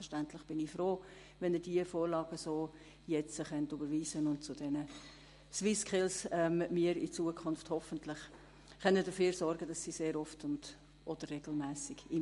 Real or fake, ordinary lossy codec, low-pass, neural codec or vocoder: real; MP3, 48 kbps; 14.4 kHz; none